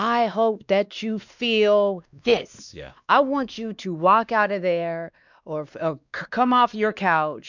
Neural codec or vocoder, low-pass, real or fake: codec, 16 kHz, 2 kbps, X-Codec, WavLM features, trained on Multilingual LibriSpeech; 7.2 kHz; fake